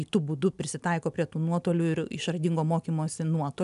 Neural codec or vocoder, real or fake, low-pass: none; real; 10.8 kHz